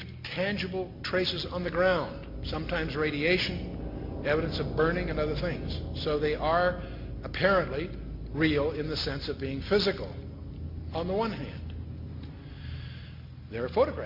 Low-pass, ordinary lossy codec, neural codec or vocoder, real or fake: 5.4 kHz; MP3, 48 kbps; none; real